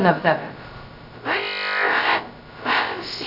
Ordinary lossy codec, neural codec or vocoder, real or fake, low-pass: none; codec, 16 kHz, 0.2 kbps, FocalCodec; fake; 5.4 kHz